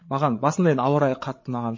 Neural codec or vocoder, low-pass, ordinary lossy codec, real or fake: codec, 16 kHz, 8 kbps, FreqCodec, larger model; 7.2 kHz; MP3, 32 kbps; fake